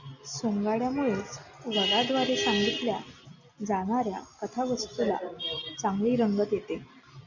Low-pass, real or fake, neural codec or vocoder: 7.2 kHz; real; none